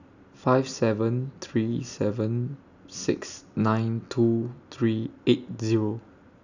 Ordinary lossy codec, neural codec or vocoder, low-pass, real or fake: none; none; 7.2 kHz; real